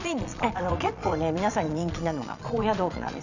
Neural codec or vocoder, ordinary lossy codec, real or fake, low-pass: vocoder, 22.05 kHz, 80 mel bands, Vocos; none; fake; 7.2 kHz